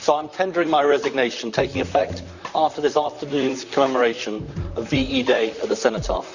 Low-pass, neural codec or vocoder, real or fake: 7.2 kHz; vocoder, 44.1 kHz, 128 mel bands, Pupu-Vocoder; fake